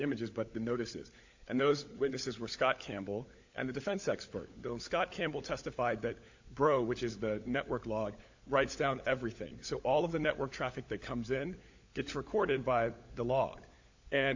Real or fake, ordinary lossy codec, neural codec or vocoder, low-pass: fake; AAC, 48 kbps; codec, 16 kHz, 16 kbps, FunCodec, trained on LibriTTS, 50 frames a second; 7.2 kHz